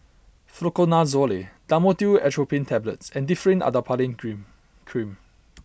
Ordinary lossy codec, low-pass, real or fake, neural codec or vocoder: none; none; real; none